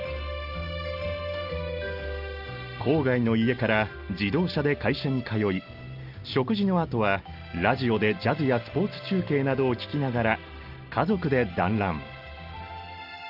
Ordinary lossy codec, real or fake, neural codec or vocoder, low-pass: Opus, 32 kbps; real; none; 5.4 kHz